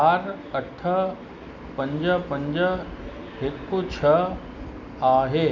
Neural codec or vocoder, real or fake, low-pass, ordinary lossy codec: none; real; 7.2 kHz; none